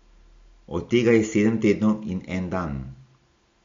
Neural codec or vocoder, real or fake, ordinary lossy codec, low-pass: none; real; MP3, 48 kbps; 7.2 kHz